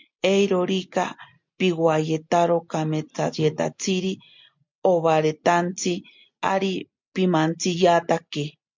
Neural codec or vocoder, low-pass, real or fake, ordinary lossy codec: none; 7.2 kHz; real; MP3, 64 kbps